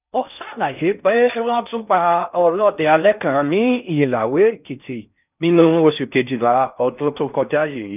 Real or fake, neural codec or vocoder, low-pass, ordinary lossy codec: fake; codec, 16 kHz in and 24 kHz out, 0.6 kbps, FocalCodec, streaming, 4096 codes; 3.6 kHz; none